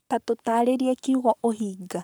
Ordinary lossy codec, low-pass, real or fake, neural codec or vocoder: none; none; fake; codec, 44.1 kHz, 7.8 kbps, Pupu-Codec